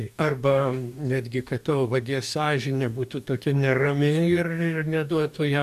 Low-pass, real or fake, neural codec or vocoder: 14.4 kHz; fake; codec, 44.1 kHz, 2.6 kbps, DAC